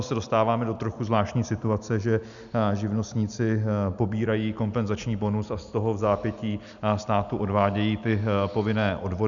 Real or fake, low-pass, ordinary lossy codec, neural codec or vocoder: real; 7.2 kHz; MP3, 96 kbps; none